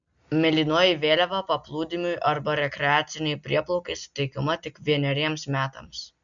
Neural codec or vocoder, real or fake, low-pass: none; real; 7.2 kHz